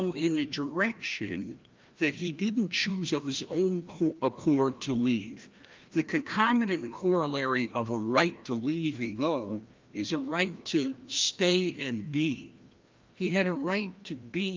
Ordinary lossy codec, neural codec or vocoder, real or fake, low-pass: Opus, 24 kbps; codec, 16 kHz, 1 kbps, FreqCodec, larger model; fake; 7.2 kHz